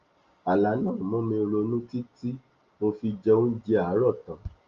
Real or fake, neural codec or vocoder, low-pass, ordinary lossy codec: real; none; 7.2 kHz; Opus, 32 kbps